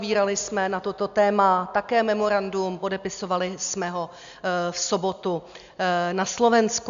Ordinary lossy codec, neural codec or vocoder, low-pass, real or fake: MP3, 64 kbps; none; 7.2 kHz; real